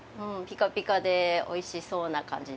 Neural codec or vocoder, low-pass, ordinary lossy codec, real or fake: none; none; none; real